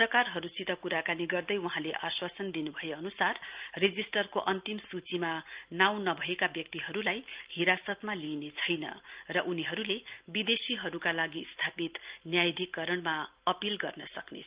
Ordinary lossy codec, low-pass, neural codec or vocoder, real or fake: Opus, 32 kbps; 3.6 kHz; none; real